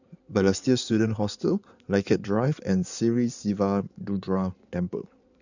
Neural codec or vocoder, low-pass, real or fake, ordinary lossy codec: codec, 16 kHz in and 24 kHz out, 2.2 kbps, FireRedTTS-2 codec; 7.2 kHz; fake; none